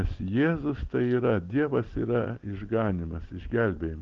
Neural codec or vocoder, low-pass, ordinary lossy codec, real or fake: none; 7.2 kHz; Opus, 16 kbps; real